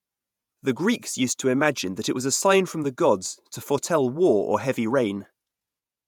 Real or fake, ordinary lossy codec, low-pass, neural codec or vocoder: fake; none; 19.8 kHz; vocoder, 48 kHz, 128 mel bands, Vocos